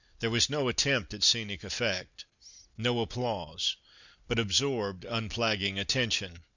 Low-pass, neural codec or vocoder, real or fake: 7.2 kHz; none; real